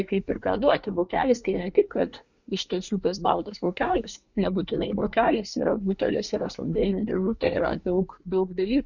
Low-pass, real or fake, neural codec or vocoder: 7.2 kHz; fake; codec, 24 kHz, 1 kbps, SNAC